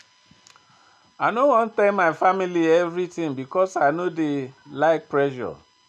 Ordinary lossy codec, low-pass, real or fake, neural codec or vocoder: none; none; real; none